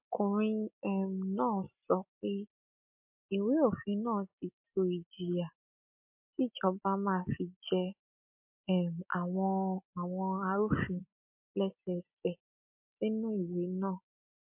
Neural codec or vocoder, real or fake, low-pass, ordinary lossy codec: none; real; 3.6 kHz; MP3, 32 kbps